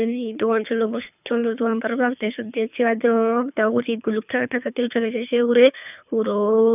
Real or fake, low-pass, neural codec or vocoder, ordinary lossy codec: fake; 3.6 kHz; codec, 24 kHz, 3 kbps, HILCodec; none